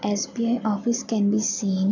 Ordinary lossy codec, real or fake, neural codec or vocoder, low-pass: none; real; none; 7.2 kHz